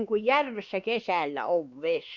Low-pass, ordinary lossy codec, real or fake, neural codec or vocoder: 7.2 kHz; Opus, 64 kbps; fake; codec, 16 kHz, 2 kbps, X-Codec, WavLM features, trained on Multilingual LibriSpeech